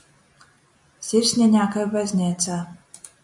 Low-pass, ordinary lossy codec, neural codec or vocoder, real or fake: 10.8 kHz; MP3, 96 kbps; none; real